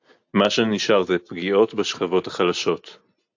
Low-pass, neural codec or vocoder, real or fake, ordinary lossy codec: 7.2 kHz; none; real; AAC, 48 kbps